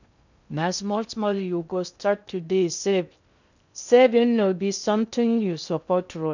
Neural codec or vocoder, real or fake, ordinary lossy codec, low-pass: codec, 16 kHz in and 24 kHz out, 0.6 kbps, FocalCodec, streaming, 2048 codes; fake; none; 7.2 kHz